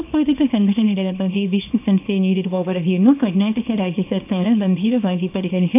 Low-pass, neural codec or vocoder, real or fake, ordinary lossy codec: 3.6 kHz; codec, 24 kHz, 0.9 kbps, WavTokenizer, small release; fake; AAC, 32 kbps